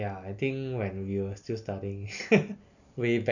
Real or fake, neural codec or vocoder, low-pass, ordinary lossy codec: real; none; 7.2 kHz; none